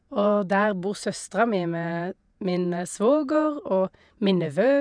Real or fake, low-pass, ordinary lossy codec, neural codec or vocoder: fake; 9.9 kHz; none; vocoder, 48 kHz, 128 mel bands, Vocos